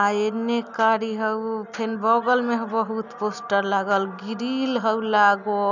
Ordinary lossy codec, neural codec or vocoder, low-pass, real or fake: none; none; 7.2 kHz; real